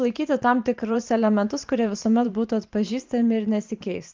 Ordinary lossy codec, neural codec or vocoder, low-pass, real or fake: Opus, 24 kbps; vocoder, 44.1 kHz, 128 mel bands every 512 samples, BigVGAN v2; 7.2 kHz; fake